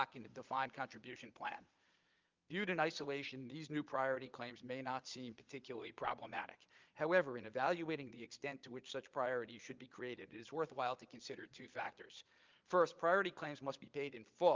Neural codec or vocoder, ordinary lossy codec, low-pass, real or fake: vocoder, 22.05 kHz, 80 mel bands, Vocos; Opus, 24 kbps; 7.2 kHz; fake